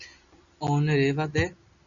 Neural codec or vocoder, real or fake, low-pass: none; real; 7.2 kHz